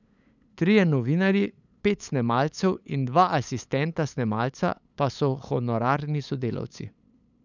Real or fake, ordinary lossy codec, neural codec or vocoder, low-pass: fake; none; codec, 16 kHz, 8 kbps, FunCodec, trained on LibriTTS, 25 frames a second; 7.2 kHz